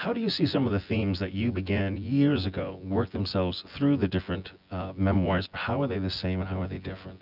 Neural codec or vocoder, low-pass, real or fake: vocoder, 24 kHz, 100 mel bands, Vocos; 5.4 kHz; fake